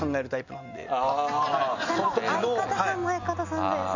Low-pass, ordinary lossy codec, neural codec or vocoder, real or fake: 7.2 kHz; none; none; real